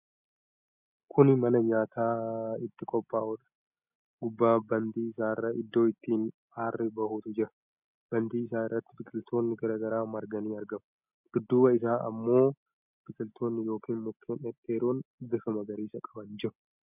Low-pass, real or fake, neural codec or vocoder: 3.6 kHz; real; none